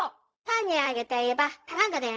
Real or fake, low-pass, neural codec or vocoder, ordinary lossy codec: fake; none; codec, 16 kHz, 0.4 kbps, LongCat-Audio-Codec; none